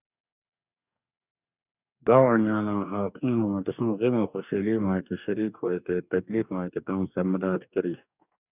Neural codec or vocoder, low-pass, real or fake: codec, 44.1 kHz, 2.6 kbps, DAC; 3.6 kHz; fake